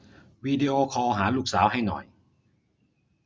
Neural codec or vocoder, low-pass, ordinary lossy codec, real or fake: none; none; none; real